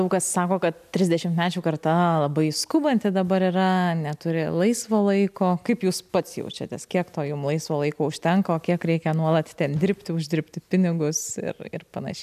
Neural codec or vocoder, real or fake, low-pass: none; real; 14.4 kHz